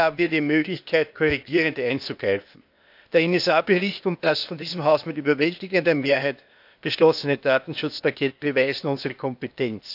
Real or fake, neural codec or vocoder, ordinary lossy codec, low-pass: fake; codec, 16 kHz, 0.8 kbps, ZipCodec; none; 5.4 kHz